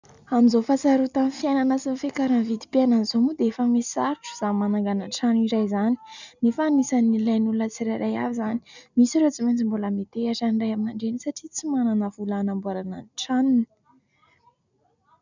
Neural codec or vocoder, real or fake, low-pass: none; real; 7.2 kHz